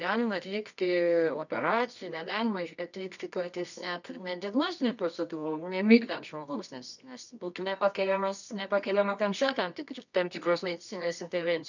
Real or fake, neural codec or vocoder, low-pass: fake; codec, 24 kHz, 0.9 kbps, WavTokenizer, medium music audio release; 7.2 kHz